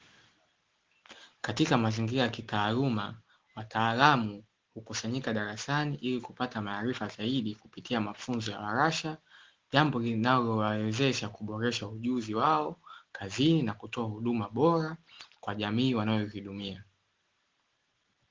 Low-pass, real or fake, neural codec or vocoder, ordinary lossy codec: 7.2 kHz; real; none; Opus, 16 kbps